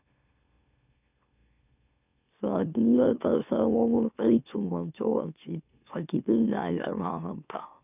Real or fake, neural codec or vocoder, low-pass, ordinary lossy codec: fake; autoencoder, 44.1 kHz, a latent of 192 numbers a frame, MeloTTS; 3.6 kHz; none